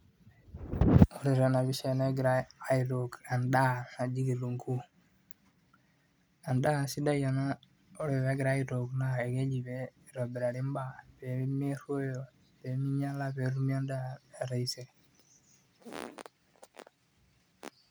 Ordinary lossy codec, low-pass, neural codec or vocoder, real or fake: none; none; none; real